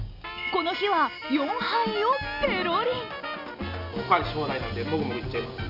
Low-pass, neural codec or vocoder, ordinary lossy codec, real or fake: 5.4 kHz; none; none; real